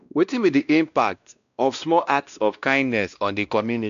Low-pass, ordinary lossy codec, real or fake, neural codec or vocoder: 7.2 kHz; none; fake; codec, 16 kHz, 1 kbps, X-Codec, WavLM features, trained on Multilingual LibriSpeech